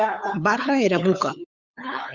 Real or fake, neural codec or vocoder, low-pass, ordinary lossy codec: fake; codec, 16 kHz, 8 kbps, FunCodec, trained on LibriTTS, 25 frames a second; 7.2 kHz; Opus, 64 kbps